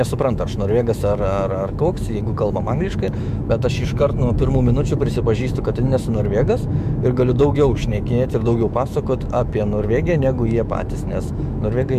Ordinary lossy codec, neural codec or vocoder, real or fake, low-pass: MP3, 96 kbps; autoencoder, 48 kHz, 128 numbers a frame, DAC-VAE, trained on Japanese speech; fake; 14.4 kHz